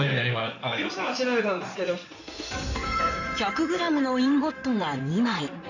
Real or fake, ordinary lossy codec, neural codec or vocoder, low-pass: fake; none; codec, 16 kHz in and 24 kHz out, 2.2 kbps, FireRedTTS-2 codec; 7.2 kHz